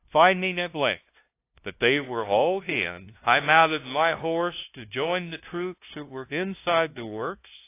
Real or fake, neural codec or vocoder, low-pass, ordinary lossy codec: fake; codec, 16 kHz, 0.5 kbps, FunCodec, trained on LibriTTS, 25 frames a second; 3.6 kHz; AAC, 24 kbps